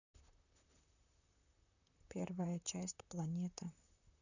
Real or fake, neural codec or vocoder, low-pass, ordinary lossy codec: fake; vocoder, 44.1 kHz, 128 mel bands, Pupu-Vocoder; 7.2 kHz; none